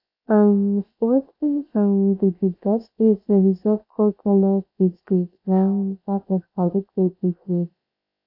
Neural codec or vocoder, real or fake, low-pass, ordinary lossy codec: codec, 16 kHz, about 1 kbps, DyCAST, with the encoder's durations; fake; 5.4 kHz; AAC, 32 kbps